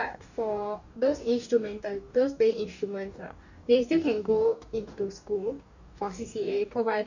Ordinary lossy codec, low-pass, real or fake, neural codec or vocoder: none; 7.2 kHz; fake; codec, 44.1 kHz, 2.6 kbps, DAC